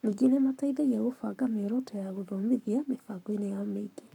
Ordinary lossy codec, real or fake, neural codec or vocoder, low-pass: none; fake; codec, 44.1 kHz, 7.8 kbps, Pupu-Codec; 19.8 kHz